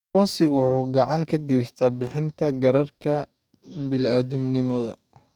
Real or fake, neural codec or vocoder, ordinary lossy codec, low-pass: fake; codec, 44.1 kHz, 2.6 kbps, DAC; none; 19.8 kHz